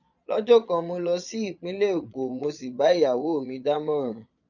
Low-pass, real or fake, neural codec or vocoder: 7.2 kHz; fake; vocoder, 22.05 kHz, 80 mel bands, Vocos